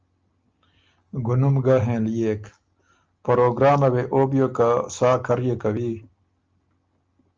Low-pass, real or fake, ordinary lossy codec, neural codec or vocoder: 7.2 kHz; real; Opus, 24 kbps; none